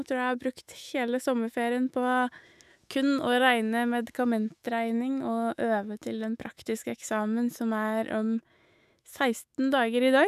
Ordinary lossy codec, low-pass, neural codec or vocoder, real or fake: none; 14.4 kHz; autoencoder, 48 kHz, 128 numbers a frame, DAC-VAE, trained on Japanese speech; fake